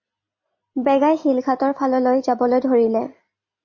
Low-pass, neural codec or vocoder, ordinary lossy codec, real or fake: 7.2 kHz; none; MP3, 32 kbps; real